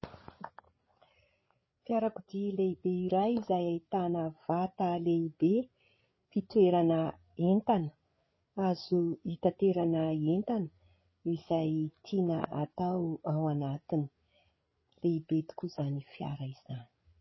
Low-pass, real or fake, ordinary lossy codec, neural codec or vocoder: 7.2 kHz; fake; MP3, 24 kbps; codec, 16 kHz, 8 kbps, FreqCodec, larger model